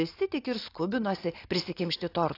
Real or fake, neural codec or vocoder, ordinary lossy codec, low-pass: real; none; AAC, 32 kbps; 5.4 kHz